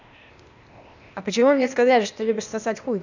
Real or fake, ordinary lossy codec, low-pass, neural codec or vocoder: fake; none; 7.2 kHz; codec, 16 kHz, 0.8 kbps, ZipCodec